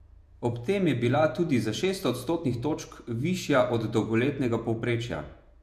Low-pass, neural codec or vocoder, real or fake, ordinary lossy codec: 14.4 kHz; vocoder, 48 kHz, 128 mel bands, Vocos; fake; MP3, 96 kbps